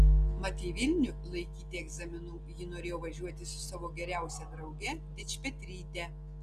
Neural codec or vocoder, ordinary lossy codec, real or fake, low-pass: none; Opus, 24 kbps; real; 14.4 kHz